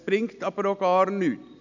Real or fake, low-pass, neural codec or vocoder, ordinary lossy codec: real; 7.2 kHz; none; none